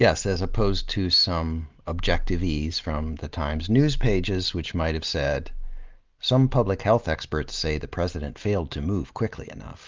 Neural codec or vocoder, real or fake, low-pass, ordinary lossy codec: none; real; 7.2 kHz; Opus, 32 kbps